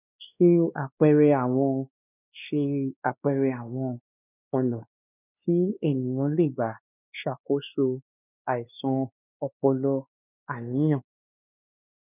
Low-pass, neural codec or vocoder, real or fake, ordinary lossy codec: 3.6 kHz; codec, 16 kHz, 2 kbps, X-Codec, WavLM features, trained on Multilingual LibriSpeech; fake; none